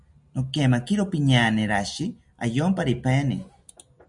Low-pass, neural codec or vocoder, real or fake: 10.8 kHz; none; real